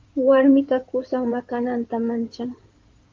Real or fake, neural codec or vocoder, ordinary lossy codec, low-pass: fake; codec, 16 kHz in and 24 kHz out, 2.2 kbps, FireRedTTS-2 codec; Opus, 24 kbps; 7.2 kHz